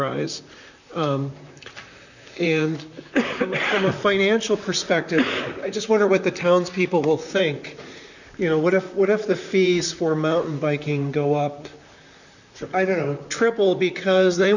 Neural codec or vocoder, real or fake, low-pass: vocoder, 44.1 kHz, 128 mel bands, Pupu-Vocoder; fake; 7.2 kHz